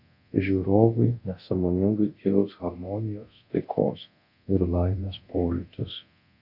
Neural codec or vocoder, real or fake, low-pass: codec, 24 kHz, 0.9 kbps, DualCodec; fake; 5.4 kHz